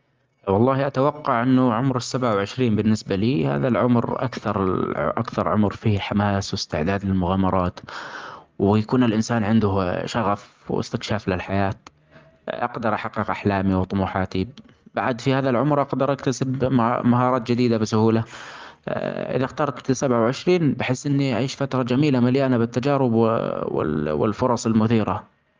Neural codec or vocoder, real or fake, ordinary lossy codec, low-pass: none; real; Opus, 32 kbps; 7.2 kHz